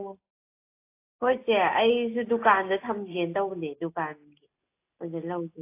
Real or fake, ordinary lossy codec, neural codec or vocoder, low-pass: real; AAC, 24 kbps; none; 3.6 kHz